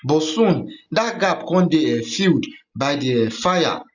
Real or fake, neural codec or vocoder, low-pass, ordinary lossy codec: real; none; 7.2 kHz; none